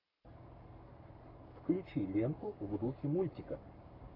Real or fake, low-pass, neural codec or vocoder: fake; 5.4 kHz; codec, 44.1 kHz, 7.8 kbps, Pupu-Codec